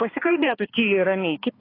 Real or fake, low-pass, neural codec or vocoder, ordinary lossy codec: fake; 5.4 kHz; codec, 16 kHz, 2 kbps, X-Codec, HuBERT features, trained on balanced general audio; AAC, 24 kbps